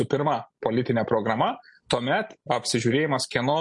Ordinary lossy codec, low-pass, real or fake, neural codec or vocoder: MP3, 48 kbps; 10.8 kHz; real; none